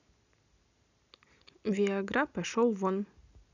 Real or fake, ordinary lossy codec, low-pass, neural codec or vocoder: real; none; 7.2 kHz; none